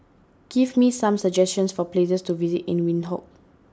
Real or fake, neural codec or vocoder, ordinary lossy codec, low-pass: real; none; none; none